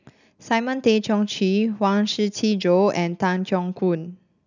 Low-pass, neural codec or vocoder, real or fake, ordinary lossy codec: 7.2 kHz; none; real; none